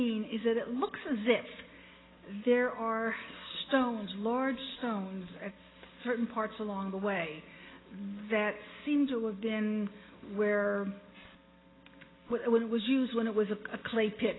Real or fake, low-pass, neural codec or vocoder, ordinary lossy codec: real; 7.2 kHz; none; AAC, 16 kbps